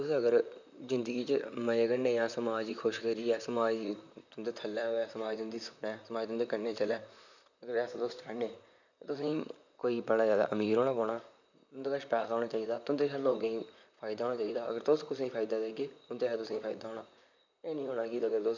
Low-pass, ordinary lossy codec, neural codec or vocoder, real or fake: 7.2 kHz; none; vocoder, 44.1 kHz, 128 mel bands, Pupu-Vocoder; fake